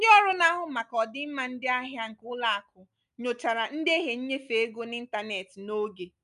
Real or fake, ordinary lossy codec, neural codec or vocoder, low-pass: real; none; none; 10.8 kHz